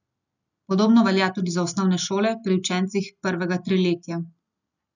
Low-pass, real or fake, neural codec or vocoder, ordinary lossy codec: 7.2 kHz; real; none; none